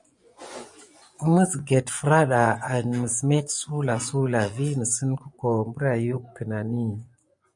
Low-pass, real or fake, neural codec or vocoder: 10.8 kHz; fake; vocoder, 24 kHz, 100 mel bands, Vocos